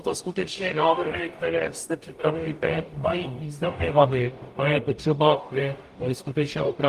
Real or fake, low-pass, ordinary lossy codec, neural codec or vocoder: fake; 14.4 kHz; Opus, 32 kbps; codec, 44.1 kHz, 0.9 kbps, DAC